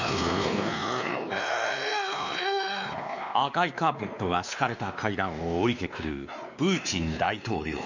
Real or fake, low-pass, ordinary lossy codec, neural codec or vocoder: fake; 7.2 kHz; none; codec, 16 kHz, 2 kbps, X-Codec, WavLM features, trained on Multilingual LibriSpeech